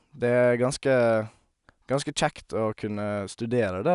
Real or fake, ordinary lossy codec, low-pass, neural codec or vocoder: real; none; 10.8 kHz; none